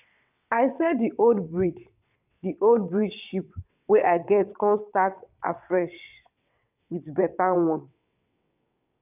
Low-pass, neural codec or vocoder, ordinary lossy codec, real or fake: 3.6 kHz; vocoder, 22.05 kHz, 80 mel bands, WaveNeXt; none; fake